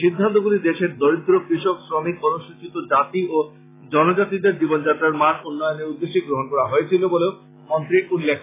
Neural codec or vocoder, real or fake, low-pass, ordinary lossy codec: none; real; 3.6 kHz; AAC, 16 kbps